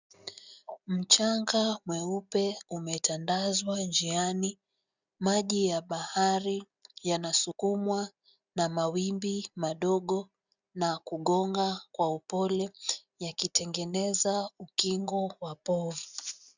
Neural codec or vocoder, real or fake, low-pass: none; real; 7.2 kHz